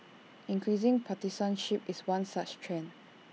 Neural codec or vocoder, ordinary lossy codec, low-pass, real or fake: none; none; none; real